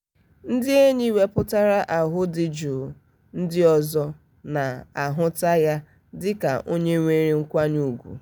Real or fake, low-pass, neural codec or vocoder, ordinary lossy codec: real; none; none; none